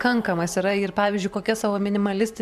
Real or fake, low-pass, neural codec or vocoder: fake; 14.4 kHz; vocoder, 44.1 kHz, 128 mel bands every 512 samples, BigVGAN v2